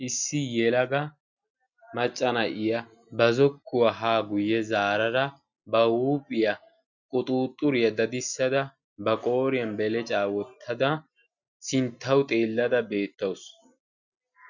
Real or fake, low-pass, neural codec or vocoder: real; 7.2 kHz; none